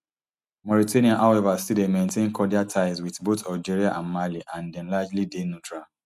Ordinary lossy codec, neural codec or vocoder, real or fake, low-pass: none; none; real; 14.4 kHz